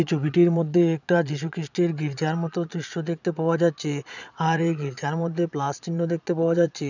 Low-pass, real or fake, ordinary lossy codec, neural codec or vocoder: 7.2 kHz; fake; none; vocoder, 22.05 kHz, 80 mel bands, Vocos